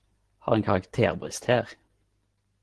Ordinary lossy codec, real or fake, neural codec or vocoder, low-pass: Opus, 16 kbps; real; none; 10.8 kHz